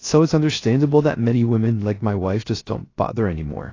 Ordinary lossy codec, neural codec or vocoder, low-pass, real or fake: AAC, 32 kbps; codec, 16 kHz, 0.3 kbps, FocalCodec; 7.2 kHz; fake